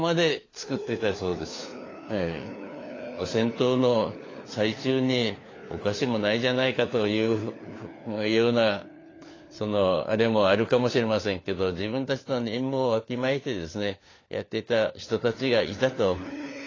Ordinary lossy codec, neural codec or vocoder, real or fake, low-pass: AAC, 32 kbps; codec, 16 kHz, 4 kbps, FunCodec, trained on LibriTTS, 50 frames a second; fake; 7.2 kHz